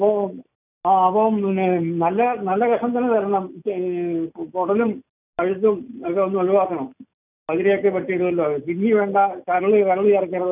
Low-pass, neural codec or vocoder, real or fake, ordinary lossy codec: 3.6 kHz; none; real; none